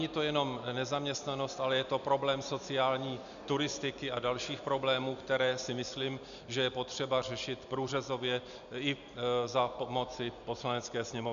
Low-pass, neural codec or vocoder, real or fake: 7.2 kHz; none; real